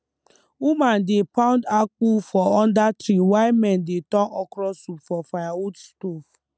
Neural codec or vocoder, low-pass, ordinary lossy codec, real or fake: none; none; none; real